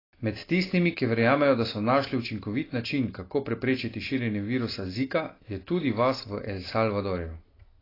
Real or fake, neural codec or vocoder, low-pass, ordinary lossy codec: real; none; 5.4 kHz; AAC, 24 kbps